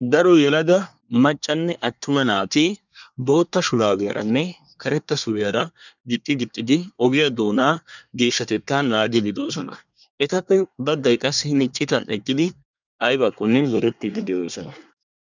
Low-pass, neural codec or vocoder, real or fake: 7.2 kHz; codec, 24 kHz, 1 kbps, SNAC; fake